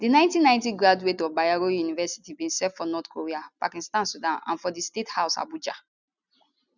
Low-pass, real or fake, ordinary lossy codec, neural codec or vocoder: 7.2 kHz; real; none; none